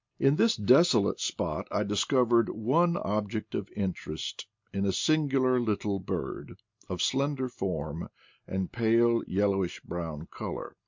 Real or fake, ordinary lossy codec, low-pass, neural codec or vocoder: real; MP3, 64 kbps; 7.2 kHz; none